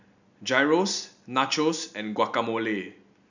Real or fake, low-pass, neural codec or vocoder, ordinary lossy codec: real; 7.2 kHz; none; none